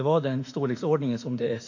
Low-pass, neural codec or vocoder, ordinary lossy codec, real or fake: 7.2 kHz; autoencoder, 48 kHz, 32 numbers a frame, DAC-VAE, trained on Japanese speech; AAC, 48 kbps; fake